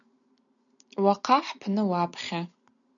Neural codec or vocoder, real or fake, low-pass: none; real; 7.2 kHz